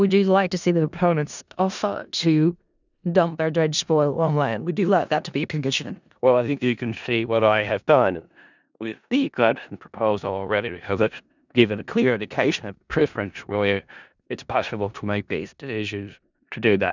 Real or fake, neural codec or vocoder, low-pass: fake; codec, 16 kHz in and 24 kHz out, 0.4 kbps, LongCat-Audio-Codec, four codebook decoder; 7.2 kHz